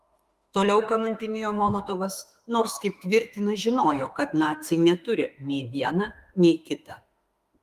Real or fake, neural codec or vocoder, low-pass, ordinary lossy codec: fake; autoencoder, 48 kHz, 32 numbers a frame, DAC-VAE, trained on Japanese speech; 14.4 kHz; Opus, 32 kbps